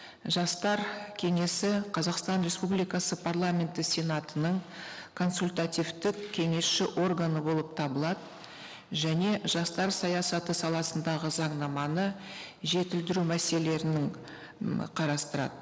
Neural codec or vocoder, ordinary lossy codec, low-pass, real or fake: none; none; none; real